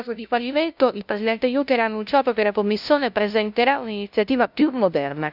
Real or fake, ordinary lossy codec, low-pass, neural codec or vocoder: fake; none; 5.4 kHz; codec, 16 kHz, 0.5 kbps, FunCodec, trained on LibriTTS, 25 frames a second